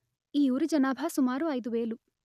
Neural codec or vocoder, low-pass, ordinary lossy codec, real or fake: none; 14.4 kHz; none; real